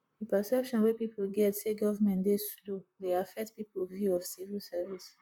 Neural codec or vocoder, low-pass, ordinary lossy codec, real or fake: vocoder, 44.1 kHz, 128 mel bands, Pupu-Vocoder; 19.8 kHz; none; fake